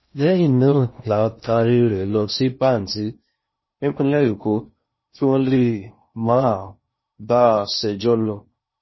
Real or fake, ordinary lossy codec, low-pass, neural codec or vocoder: fake; MP3, 24 kbps; 7.2 kHz; codec, 16 kHz in and 24 kHz out, 0.6 kbps, FocalCodec, streaming, 4096 codes